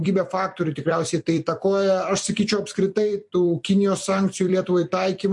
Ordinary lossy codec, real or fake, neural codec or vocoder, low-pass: MP3, 48 kbps; fake; vocoder, 44.1 kHz, 128 mel bands every 256 samples, BigVGAN v2; 10.8 kHz